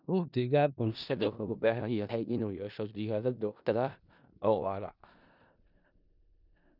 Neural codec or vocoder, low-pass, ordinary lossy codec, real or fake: codec, 16 kHz in and 24 kHz out, 0.4 kbps, LongCat-Audio-Codec, four codebook decoder; 5.4 kHz; none; fake